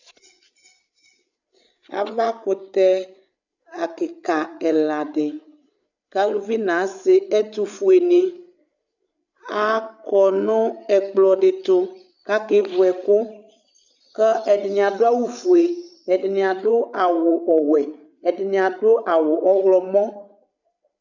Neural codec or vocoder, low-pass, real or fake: codec, 16 kHz, 8 kbps, FreqCodec, larger model; 7.2 kHz; fake